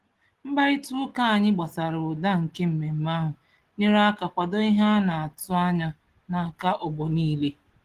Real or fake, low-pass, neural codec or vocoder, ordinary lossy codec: real; 14.4 kHz; none; Opus, 16 kbps